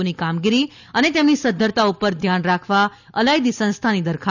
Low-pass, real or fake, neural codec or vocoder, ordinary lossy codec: 7.2 kHz; real; none; none